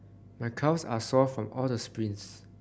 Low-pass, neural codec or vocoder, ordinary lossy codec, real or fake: none; none; none; real